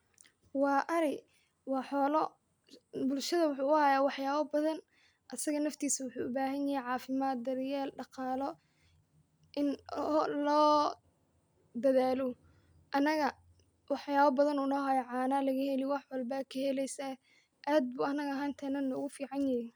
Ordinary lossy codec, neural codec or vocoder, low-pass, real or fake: none; none; none; real